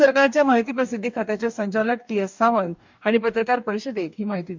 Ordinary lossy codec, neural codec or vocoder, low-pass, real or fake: MP3, 64 kbps; codec, 44.1 kHz, 2.6 kbps, DAC; 7.2 kHz; fake